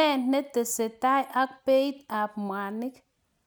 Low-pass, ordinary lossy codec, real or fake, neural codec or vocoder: none; none; real; none